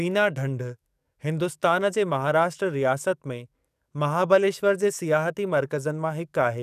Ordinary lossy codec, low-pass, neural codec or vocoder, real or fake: none; 14.4 kHz; codec, 44.1 kHz, 7.8 kbps, DAC; fake